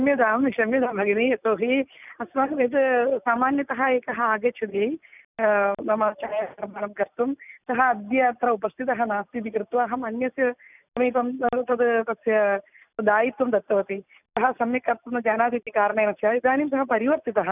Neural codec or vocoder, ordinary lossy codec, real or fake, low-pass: none; none; real; 3.6 kHz